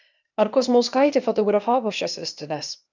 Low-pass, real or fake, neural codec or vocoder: 7.2 kHz; fake; codec, 16 kHz, 0.8 kbps, ZipCodec